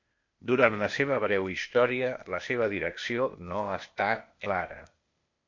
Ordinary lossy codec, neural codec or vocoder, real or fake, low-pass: MP3, 48 kbps; codec, 16 kHz, 0.8 kbps, ZipCodec; fake; 7.2 kHz